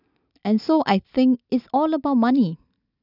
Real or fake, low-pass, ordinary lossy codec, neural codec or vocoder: real; 5.4 kHz; none; none